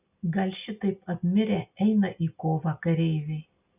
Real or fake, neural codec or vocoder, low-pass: real; none; 3.6 kHz